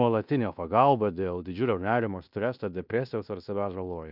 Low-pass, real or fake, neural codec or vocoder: 5.4 kHz; fake; codec, 16 kHz in and 24 kHz out, 0.9 kbps, LongCat-Audio-Codec, fine tuned four codebook decoder